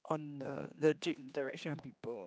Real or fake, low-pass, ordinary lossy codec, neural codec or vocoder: fake; none; none; codec, 16 kHz, 2 kbps, X-Codec, HuBERT features, trained on general audio